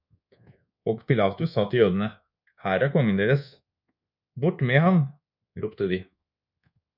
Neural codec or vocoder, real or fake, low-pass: codec, 24 kHz, 1.2 kbps, DualCodec; fake; 5.4 kHz